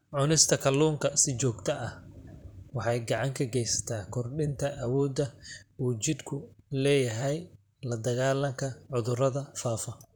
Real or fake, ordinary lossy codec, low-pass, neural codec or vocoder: fake; none; none; vocoder, 44.1 kHz, 128 mel bands, Pupu-Vocoder